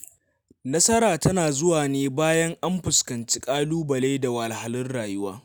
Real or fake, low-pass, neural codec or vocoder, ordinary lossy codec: real; none; none; none